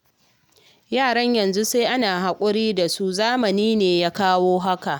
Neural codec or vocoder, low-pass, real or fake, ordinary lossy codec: none; none; real; none